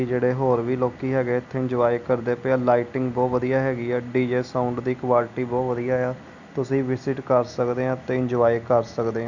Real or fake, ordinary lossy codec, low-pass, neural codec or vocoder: real; none; 7.2 kHz; none